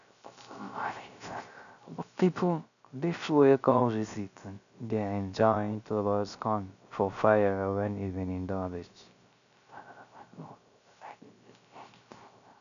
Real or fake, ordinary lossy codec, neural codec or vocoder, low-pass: fake; none; codec, 16 kHz, 0.3 kbps, FocalCodec; 7.2 kHz